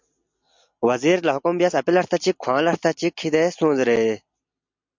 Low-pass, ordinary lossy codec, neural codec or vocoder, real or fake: 7.2 kHz; MP3, 64 kbps; none; real